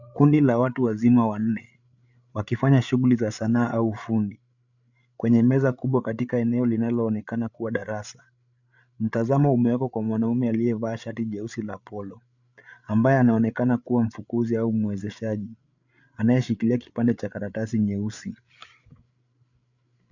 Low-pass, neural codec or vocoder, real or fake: 7.2 kHz; codec, 16 kHz, 8 kbps, FreqCodec, larger model; fake